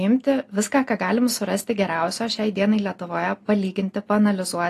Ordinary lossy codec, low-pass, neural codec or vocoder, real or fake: AAC, 48 kbps; 14.4 kHz; none; real